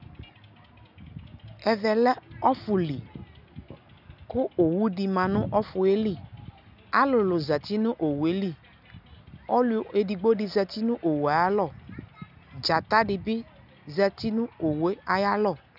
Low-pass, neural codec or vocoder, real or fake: 5.4 kHz; none; real